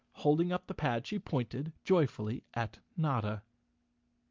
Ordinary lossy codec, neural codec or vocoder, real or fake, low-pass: Opus, 32 kbps; none; real; 7.2 kHz